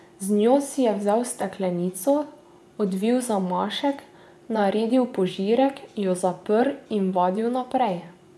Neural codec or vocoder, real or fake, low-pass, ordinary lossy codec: vocoder, 24 kHz, 100 mel bands, Vocos; fake; none; none